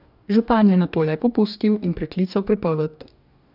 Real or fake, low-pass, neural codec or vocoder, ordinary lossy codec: fake; 5.4 kHz; codec, 44.1 kHz, 2.6 kbps, DAC; none